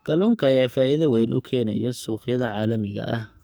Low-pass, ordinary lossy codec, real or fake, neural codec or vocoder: none; none; fake; codec, 44.1 kHz, 2.6 kbps, SNAC